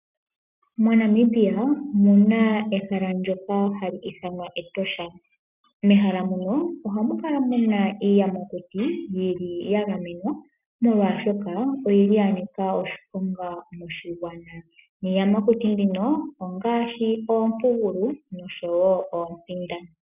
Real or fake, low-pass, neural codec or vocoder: real; 3.6 kHz; none